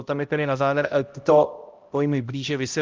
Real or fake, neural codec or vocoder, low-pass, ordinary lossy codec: fake; codec, 16 kHz, 0.5 kbps, X-Codec, HuBERT features, trained on balanced general audio; 7.2 kHz; Opus, 32 kbps